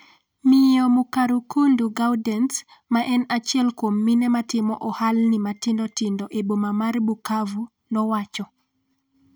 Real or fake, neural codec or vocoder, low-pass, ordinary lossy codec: real; none; none; none